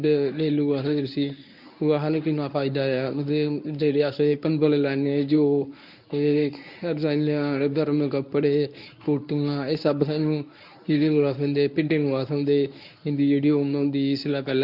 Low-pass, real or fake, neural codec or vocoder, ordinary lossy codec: 5.4 kHz; fake; codec, 24 kHz, 0.9 kbps, WavTokenizer, medium speech release version 1; none